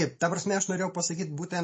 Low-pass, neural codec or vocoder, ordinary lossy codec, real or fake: 9.9 kHz; none; MP3, 32 kbps; real